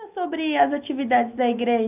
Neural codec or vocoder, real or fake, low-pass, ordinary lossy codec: none; real; 3.6 kHz; none